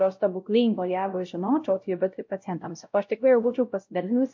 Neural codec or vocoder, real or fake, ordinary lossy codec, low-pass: codec, 16 kHz, 0.5 kbps, X-Codec, WavLM features, trained on Multilingual LibriSpeech; fake; MP3, 48 kbps; 7.2 kHz